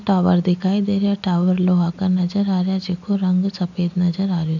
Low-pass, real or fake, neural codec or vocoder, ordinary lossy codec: 7.2 kHz; real; none; none